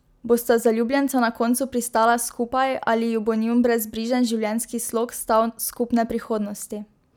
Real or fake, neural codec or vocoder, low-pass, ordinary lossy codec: real; none; none; none